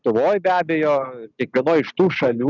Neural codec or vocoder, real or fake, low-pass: none; real; 7.2 kHz